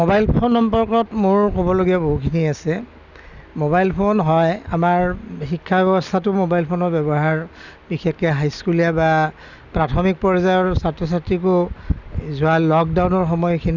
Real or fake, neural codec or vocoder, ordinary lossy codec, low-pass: real; none; none; 7.2 kHz